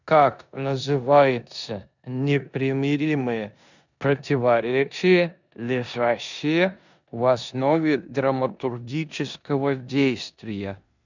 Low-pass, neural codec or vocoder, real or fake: 7.2 kHz; codec, 16 kHz in and 24 kHz out, 0.9 kbps, LongCat-Audio-Codec, four codebook decoder; fake